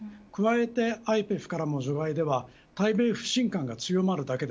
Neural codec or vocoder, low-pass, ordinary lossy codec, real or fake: none; none; none; real